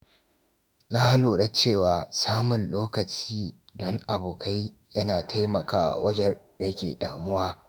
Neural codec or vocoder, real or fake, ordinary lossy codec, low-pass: autoencoder, 48 kHz, 32 numbers a frame, DAC-VAE, trained on Japanese speech; fake; none; none